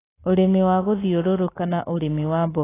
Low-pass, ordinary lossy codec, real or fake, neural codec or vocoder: 3.6 kHz; AAC, 16 kbps; real; none